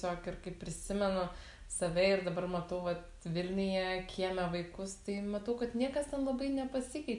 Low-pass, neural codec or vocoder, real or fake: 10.8 kHz; none; real